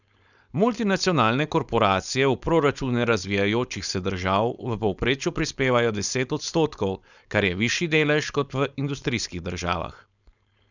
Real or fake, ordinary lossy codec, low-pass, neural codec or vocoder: fake; none; 7.2 kHz; codec, 16 kHz, 4.8 kbps, FACodec